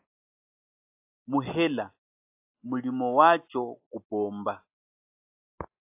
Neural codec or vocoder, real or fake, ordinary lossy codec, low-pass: none; real; AAC, 32 kbps; 3.6 kHz